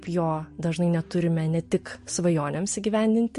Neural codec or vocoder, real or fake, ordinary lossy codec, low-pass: none; real; MP3, 48 kbps; 14.4 kHz